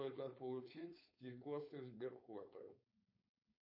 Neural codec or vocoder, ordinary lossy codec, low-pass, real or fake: codec, 16 kHz, 4.8 kbps, FACodec; MP3, 32 kbps; 5.4 kHz; fake